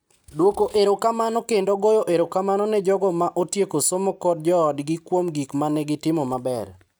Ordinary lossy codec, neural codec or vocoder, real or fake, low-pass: none; none; real; none